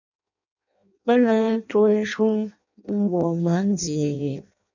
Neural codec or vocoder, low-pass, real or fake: codec, 16 kHz in and 24 kHz out, 0.6 kbps, FireRedTTS-2 codec; 7.2 kHz; fake